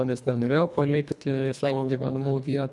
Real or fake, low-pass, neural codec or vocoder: fake; 10.8 kHz; codec, 24 kHz, 1.5 kbps, HILCodec